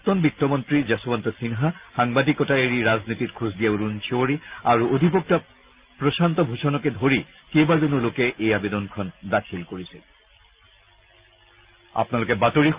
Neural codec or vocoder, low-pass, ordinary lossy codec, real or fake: none; 3.6 kHz; Opus, 24 kbps; real